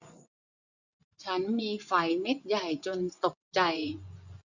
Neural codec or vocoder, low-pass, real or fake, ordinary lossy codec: vocoder, 24 kHz, 100 mel bands, Vocos; 7.2 kHz; fake; none